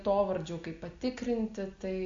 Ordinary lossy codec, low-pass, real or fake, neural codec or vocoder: AAC, 48 kbps; 7.2 kHz; real; none